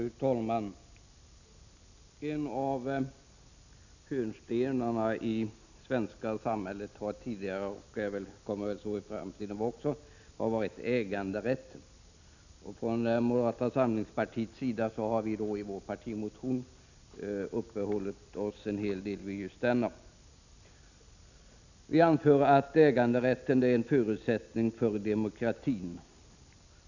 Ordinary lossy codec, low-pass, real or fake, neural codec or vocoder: none; 7.2 kHz; real; none